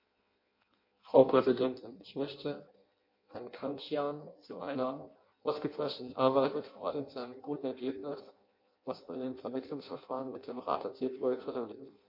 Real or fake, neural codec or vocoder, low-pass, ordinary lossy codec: fake; codec, 16 kHz in and 24 kHz out, 0.6 kbps, FireRedTTS-2 codec; 5.4 kHz; MP3, 32 kbps